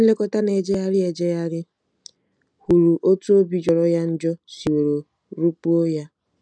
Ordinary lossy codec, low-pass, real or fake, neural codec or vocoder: none; 9.9 kHz; real; none